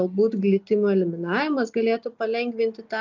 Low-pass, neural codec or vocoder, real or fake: 7.2 kHz; none; real